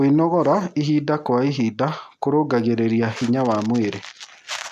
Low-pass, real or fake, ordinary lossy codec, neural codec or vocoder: 14.4 kHz; real; none; none